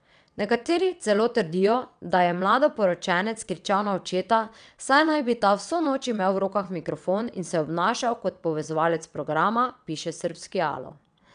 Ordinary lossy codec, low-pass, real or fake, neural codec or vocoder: none; 9.9 kHz; fake; vocoder, 22.05 kHz, 80 mel bands, WaveNeXt